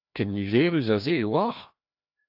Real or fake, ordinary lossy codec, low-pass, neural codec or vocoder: fake; AAC, 48 kbps; 5.4 kHz; codec, 16 kHz, 1 kbps, FreqCodec, larger model